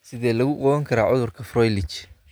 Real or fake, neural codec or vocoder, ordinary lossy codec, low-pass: real; none; none; none